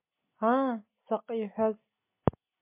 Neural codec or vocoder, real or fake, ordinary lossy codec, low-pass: none; real; MP3, 24 kbps; 3.6 kHz